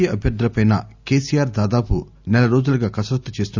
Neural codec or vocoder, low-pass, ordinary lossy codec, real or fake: none; 7.2 kHz; none; real